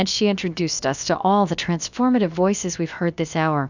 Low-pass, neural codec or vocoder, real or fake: 7.2 kHz; codec, 16 kHz, about 1 kbps, DyCAST, with the encoder's durations; fake